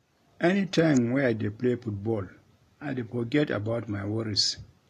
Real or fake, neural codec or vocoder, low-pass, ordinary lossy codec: real; none; 14.4 kHz; AAC, 48 kbps